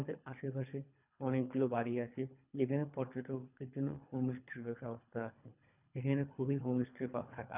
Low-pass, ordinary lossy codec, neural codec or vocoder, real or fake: 3.6 kHz; none; codec, 24 kHz, 3 kbps, HILCodec; fake